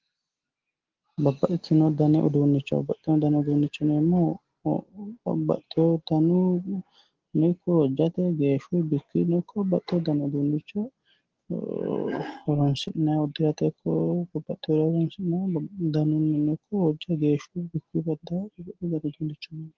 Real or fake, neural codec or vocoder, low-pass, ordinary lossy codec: real; none; 7.2 kHz; Opus, 16 kbps